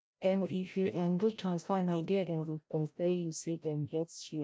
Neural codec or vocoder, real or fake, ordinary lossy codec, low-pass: codec, 16 kHz, 0.5 kbps, FreqCodec, larger model; fake; none; none